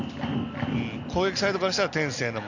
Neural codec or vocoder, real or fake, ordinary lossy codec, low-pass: none; real; none; 7.2 kHz